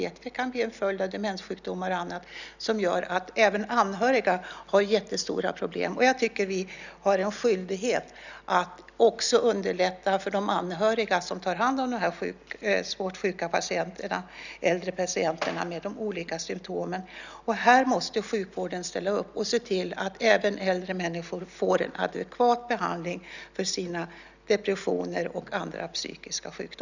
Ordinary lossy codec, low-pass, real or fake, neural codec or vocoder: none; 7.2 kHz; real; none